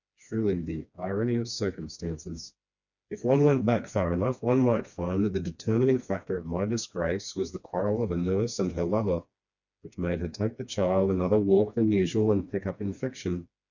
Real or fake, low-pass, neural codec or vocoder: fake; 7.2 kHz; codec, 16 kHz, 2 kbps, FreqCodec, smaller model